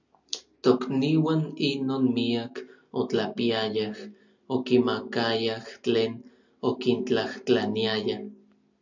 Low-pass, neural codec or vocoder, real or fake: 7.2 kHz; none; real